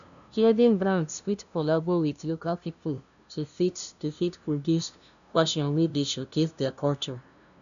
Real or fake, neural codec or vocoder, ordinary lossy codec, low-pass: fake; codec, 16 kHz, 0.5 kbps, FunCodec, trained on LibriTTS, 25 frames a second; none; 7.2 kHz